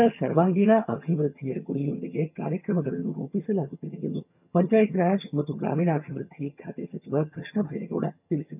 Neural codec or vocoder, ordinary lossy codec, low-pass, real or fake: vocoder, 22.05 kHz, 80 mel bands, HiFi-GAN; none; 3.6 kHz; fake